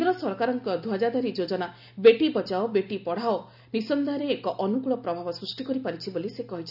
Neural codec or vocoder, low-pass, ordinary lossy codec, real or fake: none; 5.4 kHz; none; real